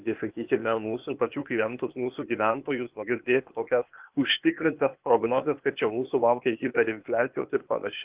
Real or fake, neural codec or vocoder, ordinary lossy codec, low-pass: fake; codec, 16 kHz, 0.8 kbps, ZipCodec; Opus, 32 kbps; 3.6 kHz